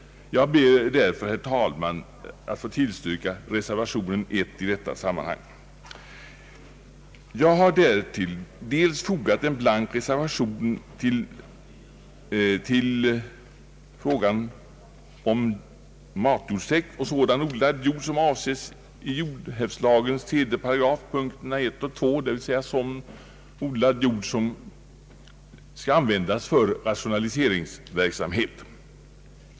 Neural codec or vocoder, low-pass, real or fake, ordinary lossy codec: none; none; real; none